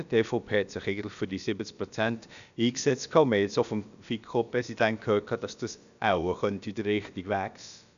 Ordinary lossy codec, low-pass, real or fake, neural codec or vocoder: none; 7.2 kHz; fake; codec, 16 kHz, about 1 kbps, DyCAST, with the encoder's durations